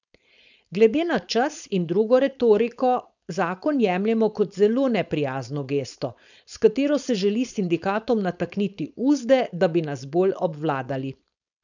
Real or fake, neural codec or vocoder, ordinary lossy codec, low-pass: fake; codec, 16 kHz, 4.8 kbps, FACodec; none; 7.2 kHz